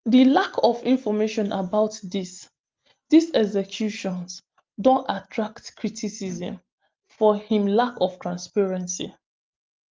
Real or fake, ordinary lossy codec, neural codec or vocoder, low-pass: real; Opus, 32 kbps; none; 7.2 kHz